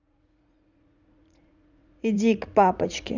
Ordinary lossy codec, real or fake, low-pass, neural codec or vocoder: none; fake; 7.2 kHz; vocoder, 44.1 kHz, 80 mel bands, Vocos